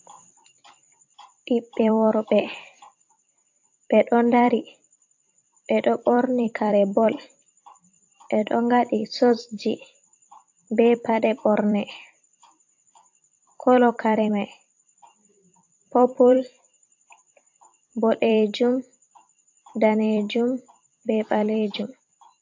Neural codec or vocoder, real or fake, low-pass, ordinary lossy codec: vocoder, 44.1 kHz, 128 mel bands every 256 samples, BigVGAN v2; fake; 7.2 kHz; AAC, 48 kbps